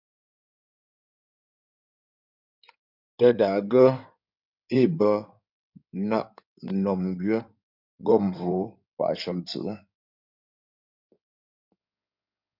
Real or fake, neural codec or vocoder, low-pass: fake; codec, 16 kHz in and 24 kHz out, 2.2 kbps, FireRedTTS-2 codec; 5.4 kHz